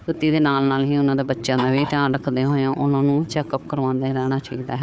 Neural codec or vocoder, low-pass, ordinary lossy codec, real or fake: codec, 16 kHz, 4 kbps, FunCodec, trained on Chinese and English, 50 frames a second; none; none; fake